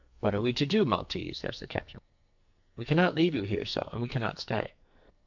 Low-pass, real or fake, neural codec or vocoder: 7.2 kHz; fake; codec, 44.1 kHz, 2.6 kbps, SNAC